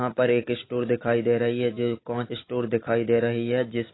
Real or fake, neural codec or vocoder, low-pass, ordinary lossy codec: real; none; 7.2 kHz; AAC, 16 kbps